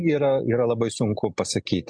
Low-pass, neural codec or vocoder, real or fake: 9.9 kHz; none; real